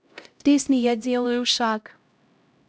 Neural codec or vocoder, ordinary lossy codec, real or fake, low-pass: codec, 16 kHz, 0.5 kbps, X-Codec, HuBERT features, trained on LibriSpeech; none; fake; none